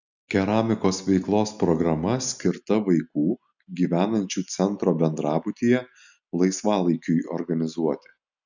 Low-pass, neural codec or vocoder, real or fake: 7.2 kHz; none; real